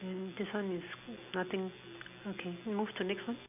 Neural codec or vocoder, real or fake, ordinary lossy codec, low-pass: none; real; none; 3.6 kHz